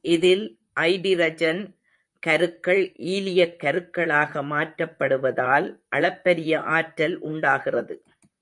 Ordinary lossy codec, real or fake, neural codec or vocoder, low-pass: MP3, 64 kbps; fake; vocoder, 44.1 kHz, 128 mel bands, Pupu-Vocoder; 10.8 kHz